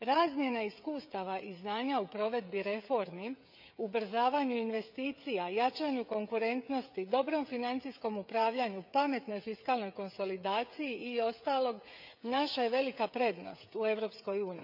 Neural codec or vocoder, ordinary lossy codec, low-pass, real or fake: codec, 16 kHz, 16 kbps, FreqCodec, smaller model; none; 5.4 kHz; fake